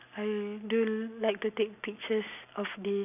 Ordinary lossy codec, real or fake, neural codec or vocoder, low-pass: none; real; none; 3.6 kHz